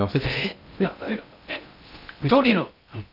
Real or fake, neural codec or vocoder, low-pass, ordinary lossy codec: fake; codec, 16 kHz in and 24 kHz out, 0.6 kbps, FocalCodec, streaming, 2048 codes; 5.4 kHz; none